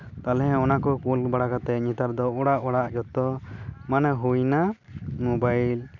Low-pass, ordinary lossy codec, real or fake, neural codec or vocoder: 7.2 kHz; none; real; none